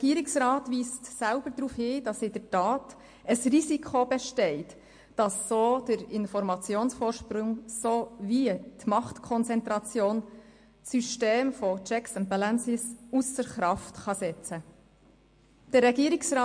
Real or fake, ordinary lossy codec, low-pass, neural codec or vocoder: real; MP3, 48 kbps; 9.9 kHz; none